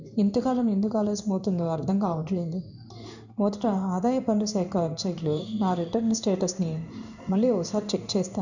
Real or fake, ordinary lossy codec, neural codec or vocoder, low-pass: fake; none; codec, 16 kHz in and 24 kHz out, 1 kbps, XY-Tokenizer; 7.2 kHz